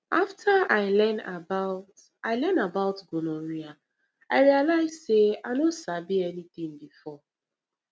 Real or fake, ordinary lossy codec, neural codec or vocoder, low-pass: real; none; none; none